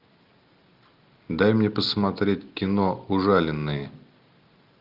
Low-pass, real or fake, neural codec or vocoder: 5.4 kHz; real; none